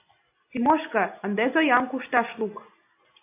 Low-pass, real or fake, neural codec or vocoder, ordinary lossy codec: 3.6 kHz; real; none; AAC, 24 kbps